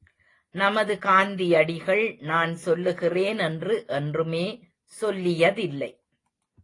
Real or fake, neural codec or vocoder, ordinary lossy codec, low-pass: real; none; AAC, 32 kbps; 10.8 kHz